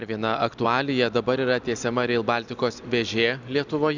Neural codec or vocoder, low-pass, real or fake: none; 7.2 kHz; real